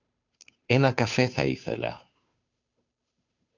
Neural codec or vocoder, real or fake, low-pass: codec, 16 kHz, 2 kbps, FunCodec, trained on Chinese and English, 25 frames a second; fake; 7.2 kHz